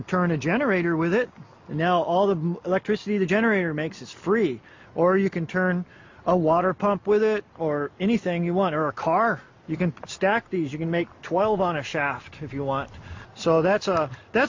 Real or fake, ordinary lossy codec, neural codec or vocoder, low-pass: real; MP3, 48 kbps; none; 7.2 kHz